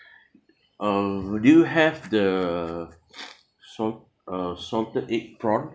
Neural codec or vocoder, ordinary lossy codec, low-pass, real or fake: none; none; none; real